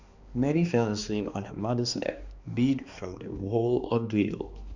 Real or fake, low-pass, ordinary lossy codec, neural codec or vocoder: fake; 7.2 kHz; Opus, 64 kbps; codec, 16 kHz, 2 kbps, X-Codec, HuBERT features, trained on balanced general audio